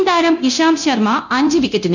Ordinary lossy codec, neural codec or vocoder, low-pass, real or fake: none; codec, 24 kHz, 0.9 kbps, DualCodec; 7.2 kHz; fake